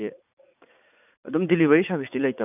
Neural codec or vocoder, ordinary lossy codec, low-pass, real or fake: vocoder, 44.1 kHz, 80 mel bands, Vocos; none; 3.6 kHz; fake